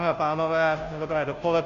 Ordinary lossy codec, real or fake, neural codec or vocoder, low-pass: AAC, 96 kbps; fake; codec, 16 kHz, 0.5 kbps, FunCodec, trained on Chinese and English, 25 frames a second; 7.2 kHz